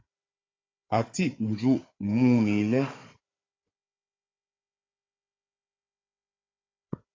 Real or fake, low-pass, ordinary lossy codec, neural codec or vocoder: fake; 7.2 kHz; AAC, 32 kbps; codec, 16 kHz, 4 kbps, FunCodec, trained on Chinese and English, 50 frames a second